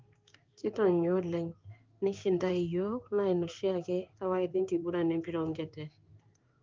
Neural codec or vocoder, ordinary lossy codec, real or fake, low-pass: codec, 16 kHz in and 24 kHz out, 1 kbps, XY-Tokenizer; Opus, 24 kbps; fake; 7.2 kHz